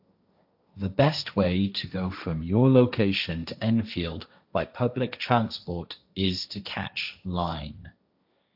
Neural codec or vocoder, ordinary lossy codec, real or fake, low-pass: codec, 16 kHz, 1.1 kbps, Voila-Tokenizer; AAC, 48 kbps; fake; 5.4 kHz